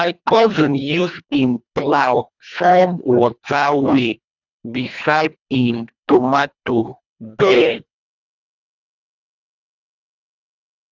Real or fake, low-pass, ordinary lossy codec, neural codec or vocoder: fake; 7.2 kHz; none; codec, 24 kHz, 1.5 kbps, HILCodec